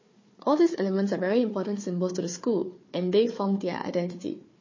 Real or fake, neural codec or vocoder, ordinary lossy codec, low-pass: fake; codec, 16 kHz, 4 kbps, FunCodec, trained on Chinese and English, 50 frames a second; MP3, 32 kbps; 7.2 kHz